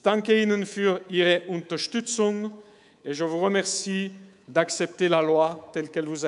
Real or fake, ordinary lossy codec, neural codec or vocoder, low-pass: fake; MP3, 96 kbps; codec, 24 kHz, 3.1 kbps, DualCodec; 10.8 kHz